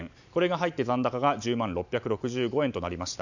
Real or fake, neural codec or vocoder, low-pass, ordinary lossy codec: real; none; 7.2 kHz; none